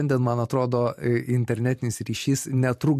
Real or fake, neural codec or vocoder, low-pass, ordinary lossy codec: fake; vocoder, 44.1 kHz, 128 mel bands every 512 samples, BigVGAN v2; 14.4 kHz; MP3, 64 kbps